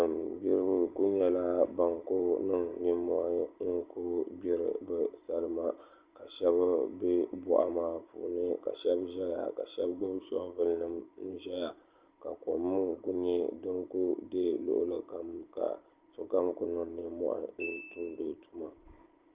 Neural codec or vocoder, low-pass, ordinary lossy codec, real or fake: none; 3.6 kHz; Opus, 32 kbps; real